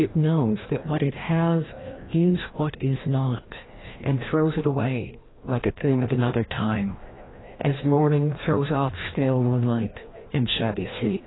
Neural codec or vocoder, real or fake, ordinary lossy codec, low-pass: codec, 16 kHz, 1 kbps, FreqCodec, larger model; fake; AAC, 16 kbps; 7.2 kHz